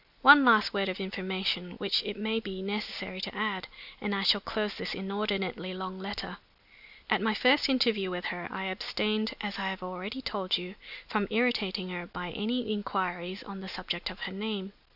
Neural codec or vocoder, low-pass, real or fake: none; 5.4 kHz; real